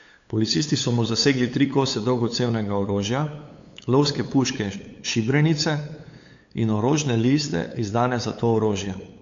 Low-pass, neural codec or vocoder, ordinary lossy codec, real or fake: 7.2 kHz; codec, 16 kHz, 8 kbps, FunCodec, trained on LibriTTS, 25 frames a second; AAC, 48 kbps; fake